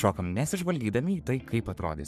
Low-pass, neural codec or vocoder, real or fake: 14.4 kHz; codec, 44.1 kHz, 3.4 kbps, Pupu-Codec; fake